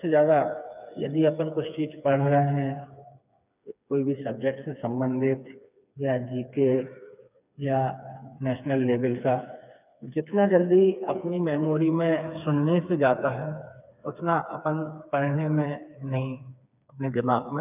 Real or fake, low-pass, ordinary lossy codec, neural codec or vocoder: fake; 3.6 kHz; none; codec, 16 kHz, 4 kbps, FreqCodec, smaller model